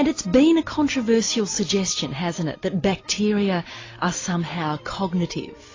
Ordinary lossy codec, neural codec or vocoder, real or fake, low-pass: AAC, 32 kbps; none; real; 7.2 kHz